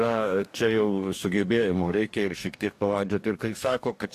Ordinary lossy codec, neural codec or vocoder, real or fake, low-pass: AAC, 64 kbps; codec, 44.1 kHz, 2.6 kbps, DAC; fake; 14.4 kHz